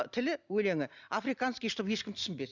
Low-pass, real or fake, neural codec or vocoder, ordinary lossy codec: 7.2 kHz; real; none; none